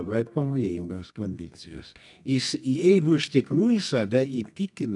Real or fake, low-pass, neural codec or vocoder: fake; 10.8 kHz; codec, 24 kHz, 0.9 kbps, WavTokenizer, medium music audio release